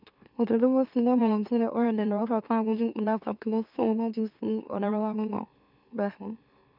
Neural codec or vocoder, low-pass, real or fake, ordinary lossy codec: autoencoder, 44.1 kHz, a latent of 192 numbers a frame, MeloTTS; 5.4 kHz; fake; none